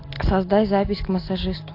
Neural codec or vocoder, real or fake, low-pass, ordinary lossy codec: none; real; 5.4 kHz; AAC, 32 kbps